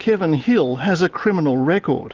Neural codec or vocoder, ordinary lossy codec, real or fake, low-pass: none; Opus, 32 kbps; real; 7.2 kHz